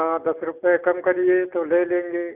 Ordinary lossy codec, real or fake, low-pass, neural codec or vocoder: none; real; 3.6 kHz; none